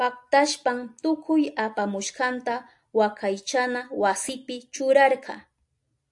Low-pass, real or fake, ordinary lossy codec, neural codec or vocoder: 10.8 kHz; real; AAC, 64 kbps; none